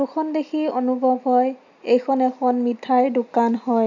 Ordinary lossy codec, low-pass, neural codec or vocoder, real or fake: none; 7.2 kHz; none; real